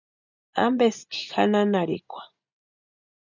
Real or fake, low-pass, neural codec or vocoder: real; 7.2 kHz; none